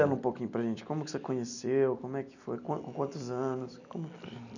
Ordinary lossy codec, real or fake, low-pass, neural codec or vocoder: none; real; 7.2 kHz; none